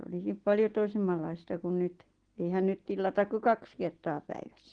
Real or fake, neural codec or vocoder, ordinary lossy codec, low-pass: real; none; Opus, 16 kbps; 9.9 kHz